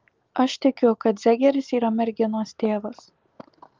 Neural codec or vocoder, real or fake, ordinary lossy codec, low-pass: none; real; Opus, 32 kbps; 7.2 kHz